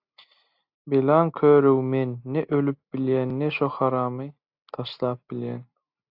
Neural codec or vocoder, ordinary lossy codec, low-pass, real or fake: none; AAC, 48 kbps; 5.4 kHz; real